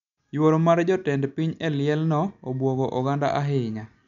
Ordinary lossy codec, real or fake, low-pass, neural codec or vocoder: none; real; 7.2 kHz; none